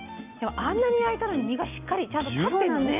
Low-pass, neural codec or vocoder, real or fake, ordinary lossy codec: 3.6 kHz; none; real; none